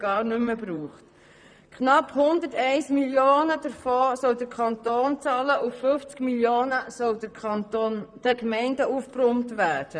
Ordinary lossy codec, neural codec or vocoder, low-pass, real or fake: none; vocoder, 44.1 kHz, 128 mel bands, Pupu-Vocoder; 9.9 kHz; fake